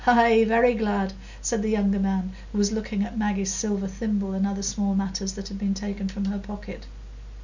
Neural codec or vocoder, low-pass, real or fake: none; 7.2 kHz; real